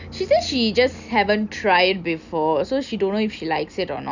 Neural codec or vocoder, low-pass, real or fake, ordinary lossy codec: none; 7.2 kHz; real; none